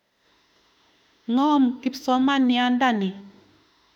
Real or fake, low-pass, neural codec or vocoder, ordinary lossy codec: fake; 19.8 kHz; autoencoder, 48 kHz, 32 numbers a frame, DAC-VAE, trained on Japanese speech; none